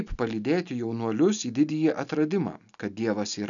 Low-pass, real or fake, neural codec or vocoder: 7.2 kHz; real; none